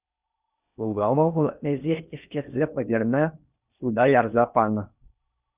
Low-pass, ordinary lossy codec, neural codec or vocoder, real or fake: 3.6 kHz; Opus, 64 kbps; codec, 16 kHz in and 24 kHz out, 0.6 kbps, FocalCodec, streaming, 4096 codes; fake